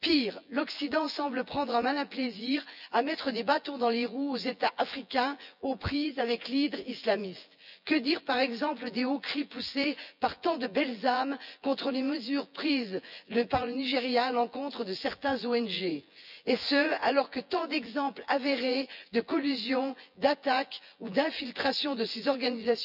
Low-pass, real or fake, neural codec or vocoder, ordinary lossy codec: 5.4 kHz; fake; vocoder, 24 kHz, 100 mel bands, Vocos; none